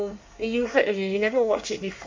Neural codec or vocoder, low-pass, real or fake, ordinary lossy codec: codec, 24 kHz, 1 kbps, SNAC; 7.2 kHz; fake; MP3, 48 kbps